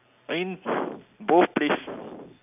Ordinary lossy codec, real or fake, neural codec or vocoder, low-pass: none; real; none; 3.6 kHz